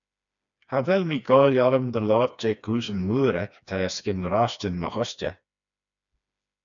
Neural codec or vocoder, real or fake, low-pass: codec, 16 kHz, 2 kbps, FreqCodec, smaller model; fake; 7.2 kHz